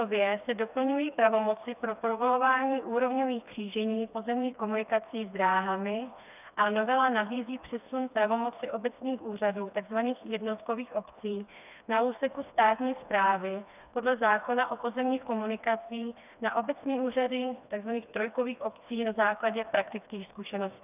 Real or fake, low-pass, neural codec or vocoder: fake; 3.6 kHz; codec, 16 kHz, 2 kbps, FreqCodec, smaller model